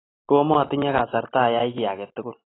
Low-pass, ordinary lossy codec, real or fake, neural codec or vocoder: 7.2 kHz; AAC, 16 kbps; real; none